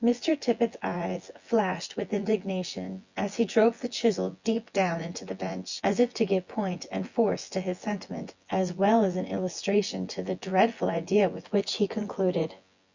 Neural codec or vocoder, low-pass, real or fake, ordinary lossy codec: vocoder, 24 kHz, 100 mel bands, Vocos; 7.2 kHz; fake; Opus, 64 kbps